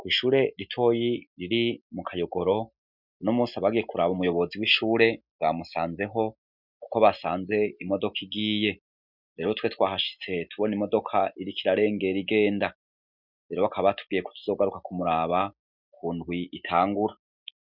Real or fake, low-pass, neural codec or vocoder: real; 5.4 kHz; none